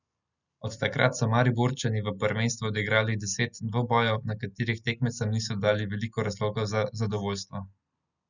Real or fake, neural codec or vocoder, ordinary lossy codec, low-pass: real; none; none; 7.2 kHz